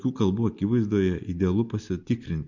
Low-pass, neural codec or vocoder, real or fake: 7.2 kHz; none; real